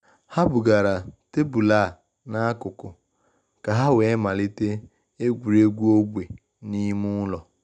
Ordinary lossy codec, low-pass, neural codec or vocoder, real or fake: none; 9.9 kHz; none; real